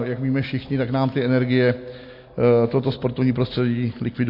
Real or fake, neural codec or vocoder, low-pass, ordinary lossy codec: real; none; 5.4 kHz; MP3, 32 kbps